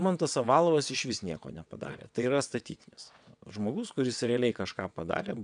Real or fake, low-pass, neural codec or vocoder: fake; 9.9 kHz; vocoder, 22.05 kHz, 80 mel bands, WaveNeXt